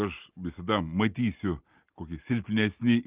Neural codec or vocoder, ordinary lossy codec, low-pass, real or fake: none; Opus, 32 kbps; 3.6 kHz; real